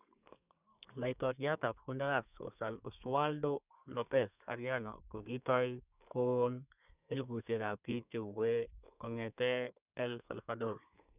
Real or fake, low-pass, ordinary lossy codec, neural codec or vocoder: fake; 3.6 kHz; none; codec, 32 kHz, 1.9 kbps, SNAC